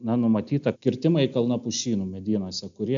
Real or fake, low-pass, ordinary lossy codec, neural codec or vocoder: real; 7.2 kHz; AAC, 64 kbps; none